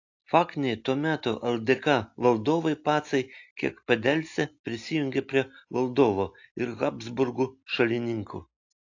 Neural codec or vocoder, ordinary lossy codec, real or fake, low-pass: none; AAC, 48 kbps; real; 7.2 kHz